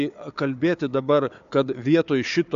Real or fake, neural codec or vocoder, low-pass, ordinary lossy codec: fake; codec, 16 kHz, 4 kbps, FunCodec, trained on Chinese and English, 50 frames a second; 7.2 kHz; AAC, 64 kbps